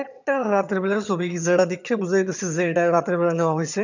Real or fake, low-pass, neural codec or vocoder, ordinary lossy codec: fake; 7.2 kHz; vocoder, 22.05 kHz, 80 mel bands, HiFi-GAN; none